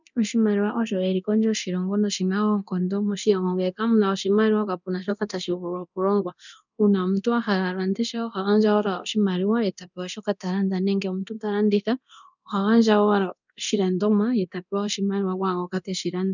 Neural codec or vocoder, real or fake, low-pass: codec, 24 kHz, 0.9 kbps, DualCodec; fake; 7.2 kHz